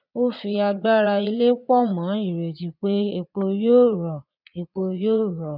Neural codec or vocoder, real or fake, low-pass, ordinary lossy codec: vocoder, 22.05 kHz, 80 mel bands, Vocos; fake; 5.4 kHz; none